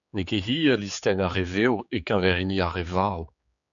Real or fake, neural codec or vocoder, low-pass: fake; codec, 16 kHz, 4 kbps, X-Codec, HuBERT features, trained on general audio; 7.2 kHz